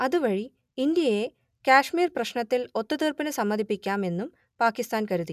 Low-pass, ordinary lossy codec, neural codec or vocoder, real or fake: 14.4 kHz; none; none; real